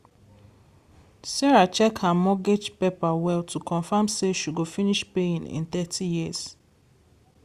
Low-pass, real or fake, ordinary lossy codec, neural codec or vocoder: 14.4 kHz; real; Opus, 64 kbps; none